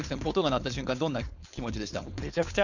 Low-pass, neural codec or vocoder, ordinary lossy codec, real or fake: 7.2 kHz; codec, 16 kHz, 4.8 kbps, FACodec; none; fake